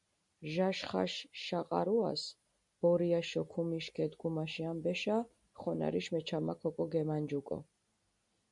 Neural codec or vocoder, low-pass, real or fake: none; 10.8 kHz; real